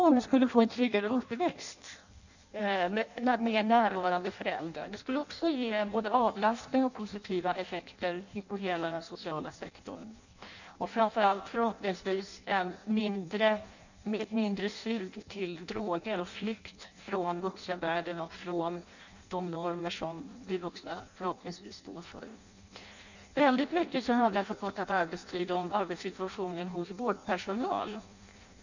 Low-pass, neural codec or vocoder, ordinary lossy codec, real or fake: 7.2 kHz; codec, 16 kHz in and 24 kHz out, 0.6 kbps, FireRedTTS-2 codec; none; fake